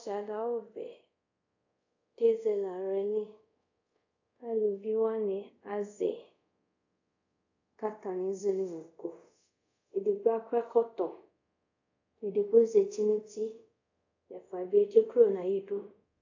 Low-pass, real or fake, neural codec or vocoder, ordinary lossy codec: 7.2 kHz; fake; codec, 24 kHz, 0.5 kbps, DualCodec; AAC, 48 kbps